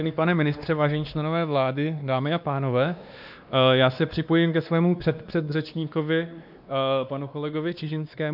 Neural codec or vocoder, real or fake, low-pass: codec, 16 kHz, 2 kbps, X-Codec, WavLM features, trained on Multilingual LibriSpeech; fake; 5.4 kHz